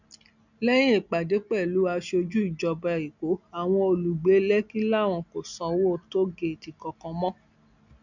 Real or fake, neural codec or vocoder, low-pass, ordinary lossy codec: real; none; 7.2 kHz; none